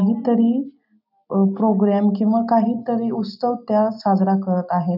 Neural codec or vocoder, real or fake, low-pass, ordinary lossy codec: none; real; 5.4 kHz; none